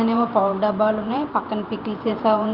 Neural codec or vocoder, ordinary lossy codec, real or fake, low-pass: vocoder, 44.1 kHz, 128 mel bands every 512 samples, BigVGAN v2; Opus, 32 kbps; fake; 5.4 kHz